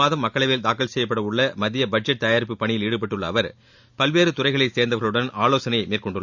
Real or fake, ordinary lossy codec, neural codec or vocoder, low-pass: real; none; none; 7.2 kHz